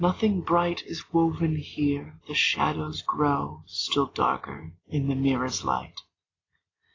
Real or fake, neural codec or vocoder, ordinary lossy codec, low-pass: real; none; AAC, 32 kbps; 7.2 kHz